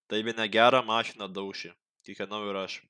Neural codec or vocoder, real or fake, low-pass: none; real; 9.9 kHz